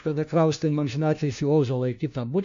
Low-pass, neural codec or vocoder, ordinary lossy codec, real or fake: 7.2 kHz; codec, 16 kHz, 1 kbps, FunCodec, trained on LibriTTS, 50 frames a second; AAC, 64 kbps; fake